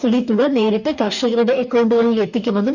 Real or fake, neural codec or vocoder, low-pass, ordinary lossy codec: fake; codec, 44.1 kHz, 2.6 kbps, DAC; 7.2 kHz; none